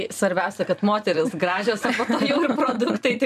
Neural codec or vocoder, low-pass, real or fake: vocoder, 44.1 kHz, 128 mel bands every 256 samples, BigVGAN v2; 14.4 kHz; fake